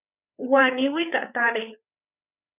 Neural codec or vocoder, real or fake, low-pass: codec, 16 kHz, 4 kbps, FreqCodec, larger model; fake; 3.6 kHz